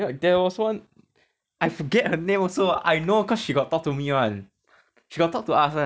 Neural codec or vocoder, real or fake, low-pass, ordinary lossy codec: none; real; none; none